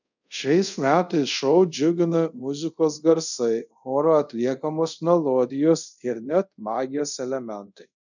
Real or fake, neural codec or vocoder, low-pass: fake; codec, 24 kHz, 0.5 kbps, DualCodec; 7.2 kHz